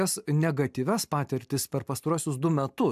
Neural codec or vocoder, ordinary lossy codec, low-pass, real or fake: none; AAC, 96 kbps; 14.4 kHz; real